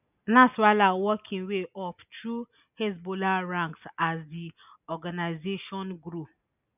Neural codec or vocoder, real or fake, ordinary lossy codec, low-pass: none; real; none; 3.6 kHz